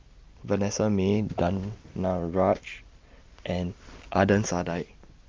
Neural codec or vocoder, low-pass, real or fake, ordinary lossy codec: none; 7.2 kHz; real; Opus, 32 kbps